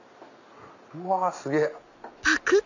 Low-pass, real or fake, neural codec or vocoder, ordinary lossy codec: 7.2 kHz; real; none; none